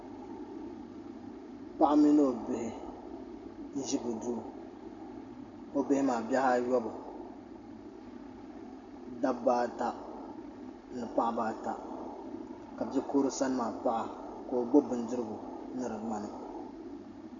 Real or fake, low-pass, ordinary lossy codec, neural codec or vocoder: real; 7.2 kHz; AAC, 64 kbps; none